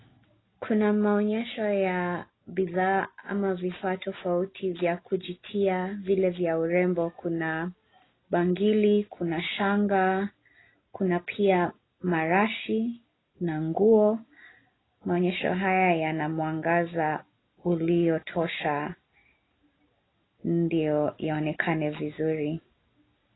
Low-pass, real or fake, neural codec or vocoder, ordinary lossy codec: 7.2 kHz; real; none; AAC, 16 kbps